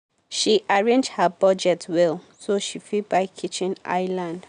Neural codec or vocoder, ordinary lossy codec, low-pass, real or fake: none; none; 9.9 kHz; real